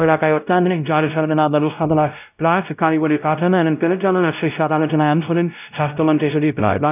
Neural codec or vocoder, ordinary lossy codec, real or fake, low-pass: codec, 16 kHz, 0.5 kbps, X-Codec, WavLM features, trained on Multilingual LibriSpeech; none; fake; 3.6 kHz